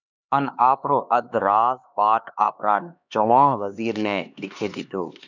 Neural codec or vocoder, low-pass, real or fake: codec, 16 kHz, 2 kbps, X-Codec, HuBERT features, trained on LibriSpeech; 7.2 kHz; fake